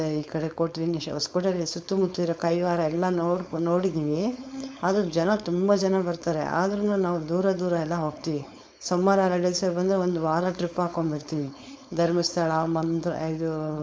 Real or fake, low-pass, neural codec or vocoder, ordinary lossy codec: fake; none; codec, 16 kHz, 4.8 kbps, FACodec; none